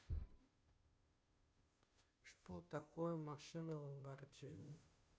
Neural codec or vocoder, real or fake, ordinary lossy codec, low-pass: codec, 16 kHz, 0.5 kbps, FunCodec, trained on Chinese and English, 25 frames a second; fake; none; none